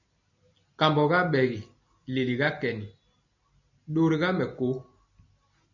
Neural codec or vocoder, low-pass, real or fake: none; 7.2 kHz; real